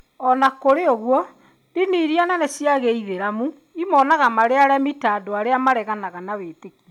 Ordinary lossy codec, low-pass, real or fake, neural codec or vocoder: none; 19.8 kHz; real; none